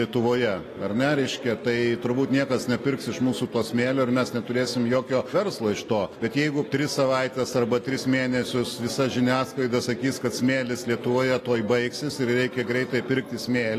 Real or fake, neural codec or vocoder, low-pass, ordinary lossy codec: real; none; 14.4 kHz; AAC, 48 kbps